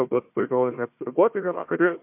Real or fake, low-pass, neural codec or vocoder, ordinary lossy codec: fake; 3.6 kHz; codec, 16 kHz, 1 kbps, FunCodec, trained on Chinese and English, 50 frames a second; MP3, 32 kbps